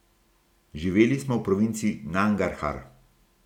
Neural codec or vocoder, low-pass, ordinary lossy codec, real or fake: none; 19.8 kHz; none; real